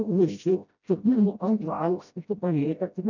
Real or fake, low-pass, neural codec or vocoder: fake; 7.2 kHz; codec, 16 kHz, 0.5 kbps, FreqCodec, smaller model